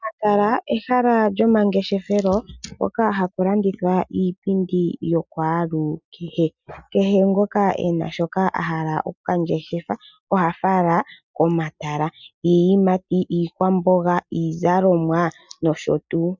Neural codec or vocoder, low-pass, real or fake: none; 7.2 kHz; real